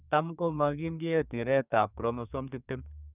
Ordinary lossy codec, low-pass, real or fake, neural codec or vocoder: none; 3.6 kHz; fake; codec, 32 kHz, 1.9 kbps, SNAC